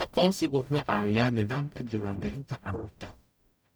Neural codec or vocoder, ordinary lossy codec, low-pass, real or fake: codec, 44.1 kHz, 0.9 kbps, DAC; none; none; fake